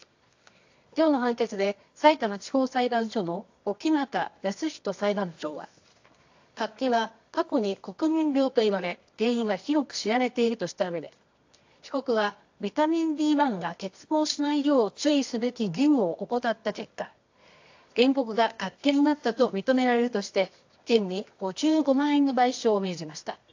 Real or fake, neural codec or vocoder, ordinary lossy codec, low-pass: fake; codec, 24 kHz, 0.9 kbps, WavTokenizer, medium music audio release; AAC, 48 kbps; 7.2 kHz